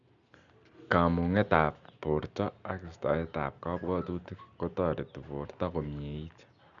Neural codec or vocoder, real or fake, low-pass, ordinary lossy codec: none; real; 7.2 kHz; none